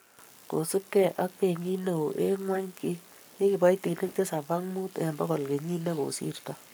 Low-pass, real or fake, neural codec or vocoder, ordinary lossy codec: none; fake; codec, 44.1 kHz, 7.8 kbps, Pupu-Codec; none